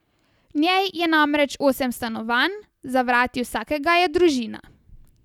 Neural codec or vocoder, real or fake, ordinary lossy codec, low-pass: none; real; none; 19.8 kHz